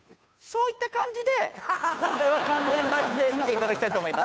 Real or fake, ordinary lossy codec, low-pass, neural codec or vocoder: fake; none; none; codec, 16 kHz, 2 kbps, FunCodec, trained on Chinese and English, 25 frames a second